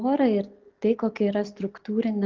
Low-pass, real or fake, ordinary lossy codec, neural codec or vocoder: 7.2 kHz; real; Opus, 24 kbps; none